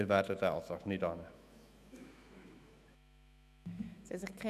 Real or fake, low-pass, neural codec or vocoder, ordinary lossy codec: fake; 14.4 kHz; autoencoder, 48 kHz, 128 numbers a frame, DAC-VAE, trained on Japanese speech; none